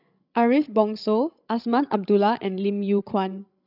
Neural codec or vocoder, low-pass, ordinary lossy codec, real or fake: codec, 16 kHz, 16 kbps, FreqCodec, larger model; 5.4 kHz; none; fake